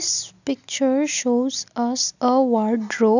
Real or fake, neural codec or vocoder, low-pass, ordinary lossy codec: real; none; 7.2 kHz; none